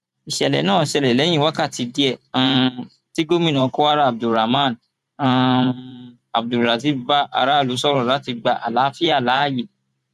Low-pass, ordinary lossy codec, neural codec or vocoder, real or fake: 14.4 kHz; none; vocoder, 44.1 kHz, 128 mel bands every 512 samples, BigVGAN v2; fake